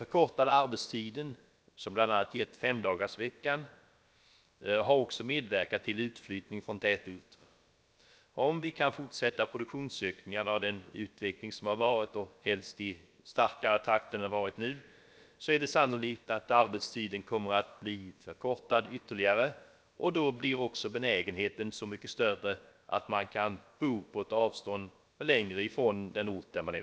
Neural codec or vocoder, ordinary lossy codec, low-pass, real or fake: codec, 16 kHz, about 1 kbps, DyCAST, with the encoder's durations; none; none; fake